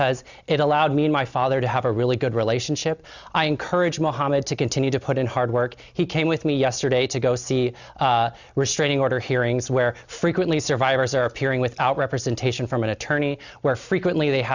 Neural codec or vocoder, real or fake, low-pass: none; real; 7.2 kHz